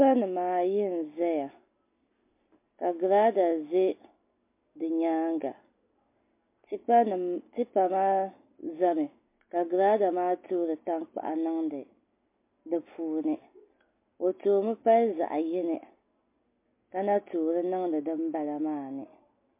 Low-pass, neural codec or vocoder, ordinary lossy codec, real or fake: 3.6 kHz; none; MP3, 24 kbps; real